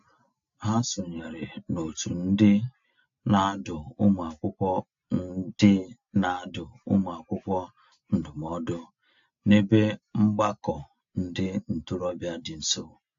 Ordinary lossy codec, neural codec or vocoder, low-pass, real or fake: AAC, 48 kbps; none; 7.2 kHz; real